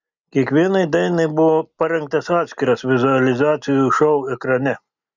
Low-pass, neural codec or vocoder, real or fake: 7.2 kHz; none; real